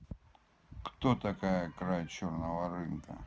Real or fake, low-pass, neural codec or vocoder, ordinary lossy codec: real; none; none; none